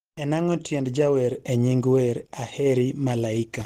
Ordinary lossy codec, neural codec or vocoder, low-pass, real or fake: Opus, 16 kbps; none; 14.4 kHz; real